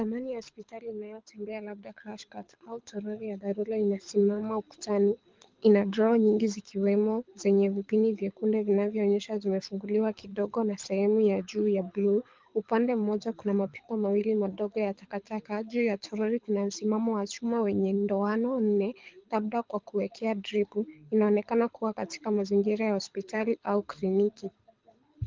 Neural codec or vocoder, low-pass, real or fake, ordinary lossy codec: codec, 24 kHz, 6 kbps, HILCodec; 7.2 kHz; fake; Opus, 32 kbps